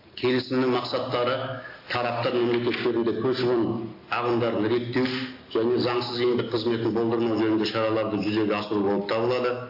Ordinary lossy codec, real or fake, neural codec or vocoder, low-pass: AAC, 32 kbps; real; none; 5.4 kHz